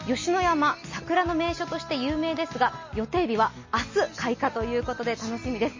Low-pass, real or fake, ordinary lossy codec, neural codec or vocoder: 7.2 kHz; real; MP3, 32 kbps; none